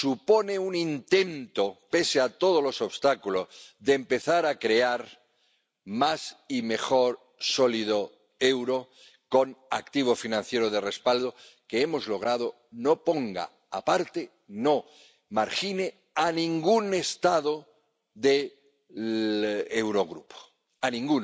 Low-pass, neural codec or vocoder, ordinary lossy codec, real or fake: none; none; none; real